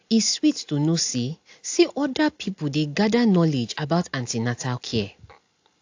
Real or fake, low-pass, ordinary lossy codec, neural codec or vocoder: real; 7.2 kHz; AAC, 48 kbps; none